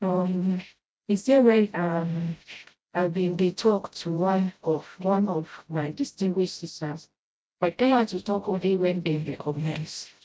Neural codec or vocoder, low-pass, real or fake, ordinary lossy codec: codec, 16 kHz, 0.5 kbps, FreqCodec, smaller model; none; fake; none